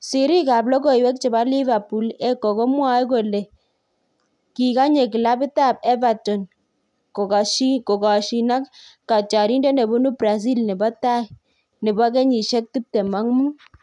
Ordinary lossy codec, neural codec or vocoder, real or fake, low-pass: none; none; real; 10.8 kHz